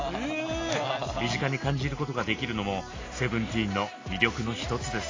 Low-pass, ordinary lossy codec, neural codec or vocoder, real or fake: 7.2 kHz; AAC, 32 kbps; none; real